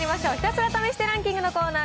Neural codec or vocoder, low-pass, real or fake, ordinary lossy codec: none; none; real; none